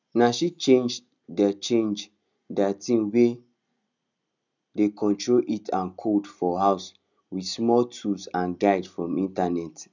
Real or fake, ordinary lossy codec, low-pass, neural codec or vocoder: real; none; 7.2 kHz; none